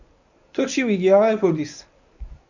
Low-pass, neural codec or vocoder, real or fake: 7.2 kHz; codec, 24 kHz, 0.9 kbps, WavTokenizer, medium speech release version 1; fake